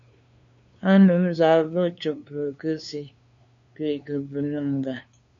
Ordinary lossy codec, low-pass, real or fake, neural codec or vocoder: MP3, 64 kbps; 7.2 kHz; fake; codec, 16 kHz, 2 kbps, FunCodec, trained on LibriTTS, 25 frames a second